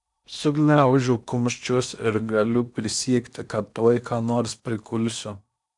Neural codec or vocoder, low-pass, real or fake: codec, 16 kHz in and 24 kHz out, 0.8 kbps, FocalCodec, streaming, 65536 codes; 10.8 kHz; fake